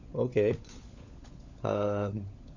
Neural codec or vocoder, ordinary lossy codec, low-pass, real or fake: codec, 16 kHz, 16 kbps, FunCodec, trained on LibriTTS, 50 frames a second; none; 7.2 kHz; fake